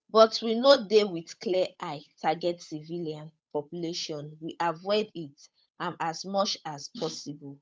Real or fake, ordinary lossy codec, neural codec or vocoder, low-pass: fake; none; codec, 16 kHz, 8 kbps, FunCodec, trained on Chinese and English, 25 frames a second; none